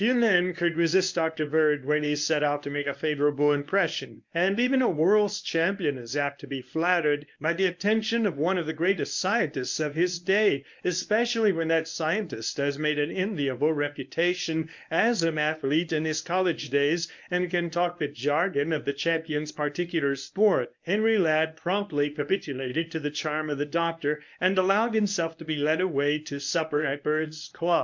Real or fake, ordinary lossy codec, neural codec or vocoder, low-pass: fake; MP3, 64 kbps; codec, 24 kHz, 0.9 kbps, WavTokenizer, medium speech release version 1; 7.2 kHz